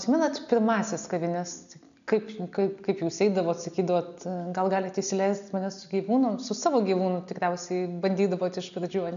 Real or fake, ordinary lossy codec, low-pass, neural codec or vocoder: real; MP3, 96 kbps; 7.2 kHz; none